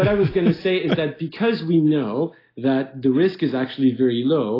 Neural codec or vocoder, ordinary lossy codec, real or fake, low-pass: none; AAC, 24 kbps; real; 5.4 kHz